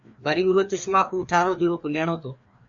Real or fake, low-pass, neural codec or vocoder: fake; 7.2 kHz; codec, 16 kHz, 2 kbps, FreqCodec, larger model